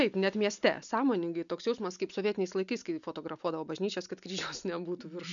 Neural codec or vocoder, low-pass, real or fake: none; 7.2 kHz; real